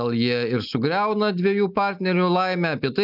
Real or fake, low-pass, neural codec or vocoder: real; 5.4 kHz; none